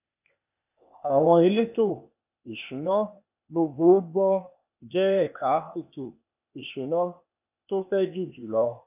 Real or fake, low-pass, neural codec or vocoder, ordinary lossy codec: fake; 3.6 kHz; codec, 16 kHz, 0.8 kbps, ZipCodec; none